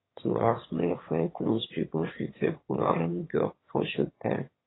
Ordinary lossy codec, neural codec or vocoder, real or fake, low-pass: AAC, 16 kbps; autoencoder, 22.05 kHz, a latent of 192 numbers a frame, VITS, trained on one speaker; fake; 7.2 kHz